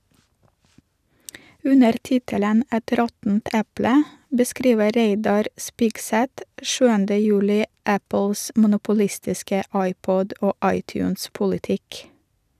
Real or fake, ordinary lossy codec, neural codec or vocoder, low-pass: real; none; none; 14.4 kHz